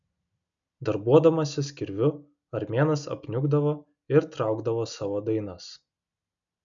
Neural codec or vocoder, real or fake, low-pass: none; real; 7.2 kHz